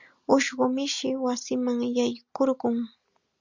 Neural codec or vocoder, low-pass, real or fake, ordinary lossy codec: none; 7.2 kHz; real; Opus, 64 kbps